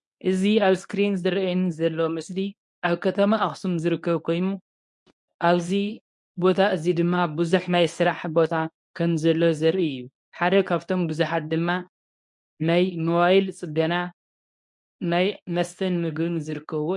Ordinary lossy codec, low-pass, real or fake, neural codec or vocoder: MP3, 64 kbps; 10.8 kHz; fake; codec, 24 kHz, 0.9 kbps, WavTokenizer, medium speech release version 1